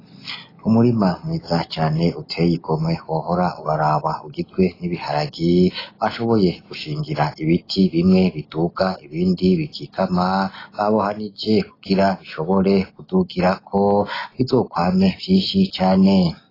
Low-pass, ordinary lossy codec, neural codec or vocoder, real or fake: 5.4 kHz; AAC, 24 kbps; none; real